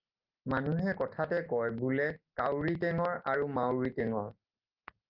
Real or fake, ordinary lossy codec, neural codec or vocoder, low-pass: real; Opus, 32 kbps; none; 5.4 kHz